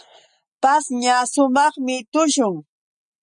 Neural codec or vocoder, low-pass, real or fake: none; 9.9 kHz; real